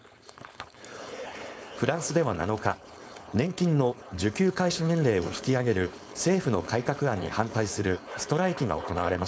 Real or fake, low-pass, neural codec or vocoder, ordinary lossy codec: fake; none; codec, 16 kHz, 4.8 kbps, FACodec; none